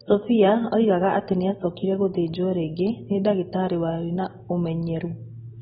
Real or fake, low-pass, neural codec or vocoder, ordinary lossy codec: real; 19.8 kHz; none; AAC, 16 kbps